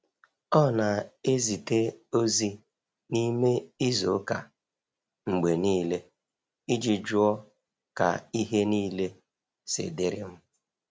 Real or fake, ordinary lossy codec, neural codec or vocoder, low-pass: real; none; none; none